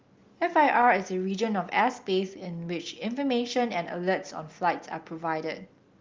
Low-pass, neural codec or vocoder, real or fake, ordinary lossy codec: 7.2 kHz; none; real; Opus, 32 kbps